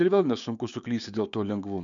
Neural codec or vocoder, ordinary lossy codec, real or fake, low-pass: codec, 16 kHz, 6 kbps, DAC; MP3, 96 kbps; fake; 7.2 kHz